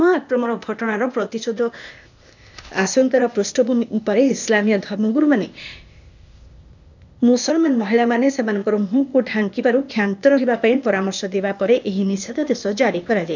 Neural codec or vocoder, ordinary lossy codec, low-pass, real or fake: codec, 16 kHz, 0.8 kbps, ZipCodec; none; 7.2 kHz; fake